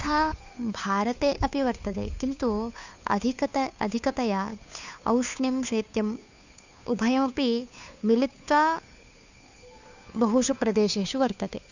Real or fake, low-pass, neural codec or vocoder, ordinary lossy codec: fake; 7.2 kHz; codec, 16 kHz, 2 kbps, FunCodec, trained on Chinese and English, 25 frames a second; none